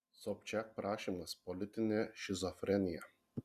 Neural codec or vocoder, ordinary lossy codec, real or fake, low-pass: none; Opus, 64 kbps; real; 14.4 kHz